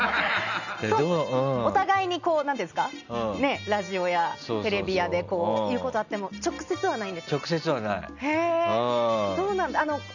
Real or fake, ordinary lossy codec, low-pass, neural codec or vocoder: real; none; 7.2 kHz; none